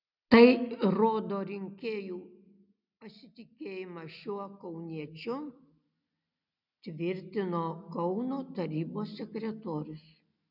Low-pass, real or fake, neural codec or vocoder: 5.4 kHz; real; none